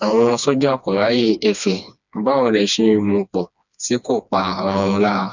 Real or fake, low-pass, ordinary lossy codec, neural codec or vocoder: fake; 7.2 kHz; none; codec, 16 kHz, 2 kbps, FreqCodec, smaller model